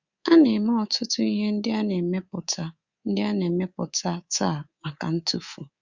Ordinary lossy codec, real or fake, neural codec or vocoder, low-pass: Opus, 64 kbps; fake; codec, 24 kHz, 3.1 kbps, DualCodec; 7.2 kHz